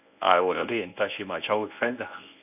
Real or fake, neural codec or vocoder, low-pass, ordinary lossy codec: fake; codec, 24 kHz, 0.9 kbps, WavTokenizer, medium speech release version 1; 3.6 kHz; none